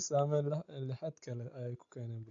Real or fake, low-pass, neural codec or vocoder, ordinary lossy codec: real; 7.2 kHz; none; AAC, 48 kbps